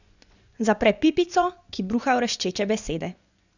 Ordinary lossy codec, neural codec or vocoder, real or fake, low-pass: none; vocoder, 24 kHz, 100 mel bands, Vocos; fake; 7.2 kHz